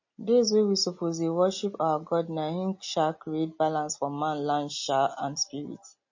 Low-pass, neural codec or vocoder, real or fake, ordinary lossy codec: 7.2 kHz; none; real; MP3, 32 kbps